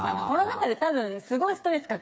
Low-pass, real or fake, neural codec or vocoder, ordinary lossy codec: none; fake; codec, 16 kHz, 4 kbps, FreqCodec, smaller model; none